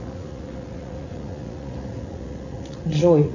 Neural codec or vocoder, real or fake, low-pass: vocoder, 44.1 kHz, 80 mel bands, Vocos; fake; 7.2 kHz